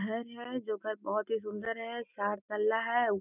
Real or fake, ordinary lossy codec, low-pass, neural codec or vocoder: real; none; 3.6 kHz; none